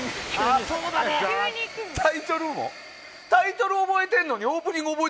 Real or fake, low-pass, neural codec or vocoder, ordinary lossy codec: real; none; none; none